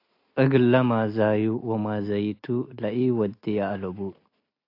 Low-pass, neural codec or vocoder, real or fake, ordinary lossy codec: 5.4 kHz; none; real; AAC, 32 kbps